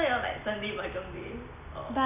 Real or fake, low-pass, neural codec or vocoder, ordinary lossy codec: real; 3.6 kHz; none; none